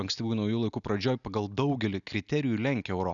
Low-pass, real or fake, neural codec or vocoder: 7.2 kHz; real; none